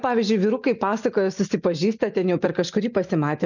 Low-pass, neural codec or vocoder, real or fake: 7.2 kHz; none; real